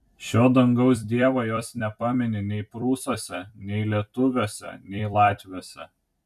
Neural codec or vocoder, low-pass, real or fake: vocoder, 44.1 kHz, 128 mel bands every 256 samples, BigVGAN v2; 14.4 kHz; fake